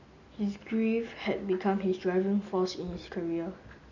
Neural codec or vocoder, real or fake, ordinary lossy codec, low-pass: none; real; none; 7.2 kHz